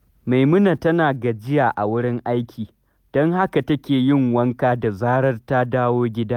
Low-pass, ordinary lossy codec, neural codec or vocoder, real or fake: 19.8 kHz; none; none; real